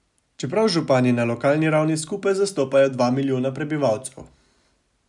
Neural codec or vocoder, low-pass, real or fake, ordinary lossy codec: none; 10.8 kHz; real; none